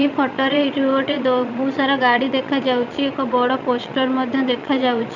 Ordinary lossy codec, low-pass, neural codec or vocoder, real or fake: none; 7.2 kHz; vocoder, 22.05 kHz, 80 mel bands, Vocos; fake